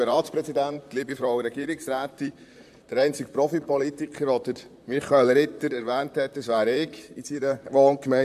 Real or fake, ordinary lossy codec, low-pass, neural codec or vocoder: fake; MP3, 96 kbps; 14.4 kHz; vocoder, 44.1 kHz, 128 mel bands, Pupu-Vocoder